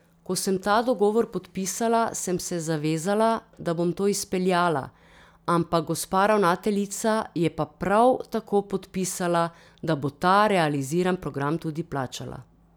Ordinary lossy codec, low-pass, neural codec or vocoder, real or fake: none; none; none; real